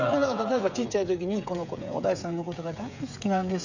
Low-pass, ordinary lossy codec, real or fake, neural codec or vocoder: 7.2 kHz; none; fake; codec, 16 kHz, 8 kbps, FreqCodec, smaller model